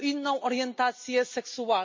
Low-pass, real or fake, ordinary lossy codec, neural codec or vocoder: 7.2 kHz; real; MP3, 32 kbps; none